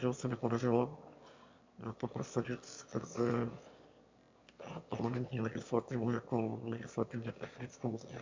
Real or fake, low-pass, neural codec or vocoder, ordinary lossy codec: fake; 7.2 kHz; autoencoder, 22.05 kHz, a latent of 192 numbers a frame, VITS, trained on one speaker; MP3, 64 kbps